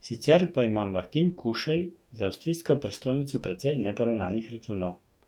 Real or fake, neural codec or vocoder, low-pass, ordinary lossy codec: fake; codec, 44.1 kHz, 2.6 kbps, DAC; 19.8 kHz; none